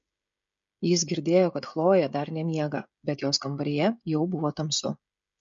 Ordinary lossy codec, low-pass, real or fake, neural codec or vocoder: MP3, 48 kbps; 7.2 kHz; fake; codec, 16 kHz, 16 kbps, FreqCodec, smaller model